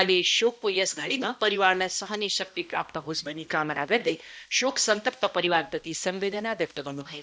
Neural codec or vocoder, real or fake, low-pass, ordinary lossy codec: codec, 16 kHz, 1 kbps, X-Codec, HuBERT features, trained on balanced general audio; fake; none; none